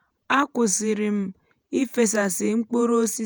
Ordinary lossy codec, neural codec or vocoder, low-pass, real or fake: none; vocoder, 48 kHz, 128 mel bands, Vocos; none; fake